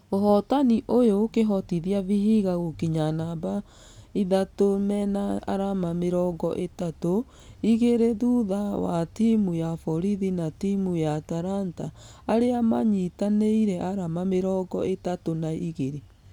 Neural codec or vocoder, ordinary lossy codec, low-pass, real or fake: none; none; 19.8 kHz; real